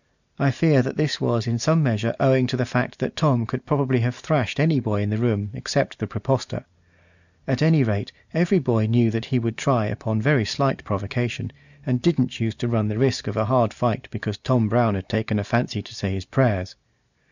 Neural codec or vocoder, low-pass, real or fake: none; 7.2 kHz; real